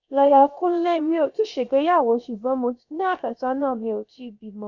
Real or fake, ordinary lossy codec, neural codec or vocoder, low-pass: fake; none; codec, 16 kHz, about 1 kbps, DyCAST, with the encoder's durations; 7.2 kHz